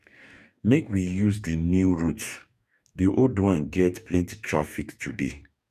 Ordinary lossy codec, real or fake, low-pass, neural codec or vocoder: none; fake; 14.4 kHz; codec, 44.1 kHz, 2.6 kbps, DAC